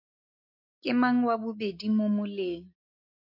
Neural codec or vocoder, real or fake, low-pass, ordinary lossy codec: none; real; 5.4 kHz; AAC, 32 kbps